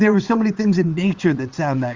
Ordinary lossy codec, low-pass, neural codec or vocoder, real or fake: Opus, 32 kbps; 7.2 kHz; vocoder, 22.05 kHz, 80 mel bands, WaveNeXt; fake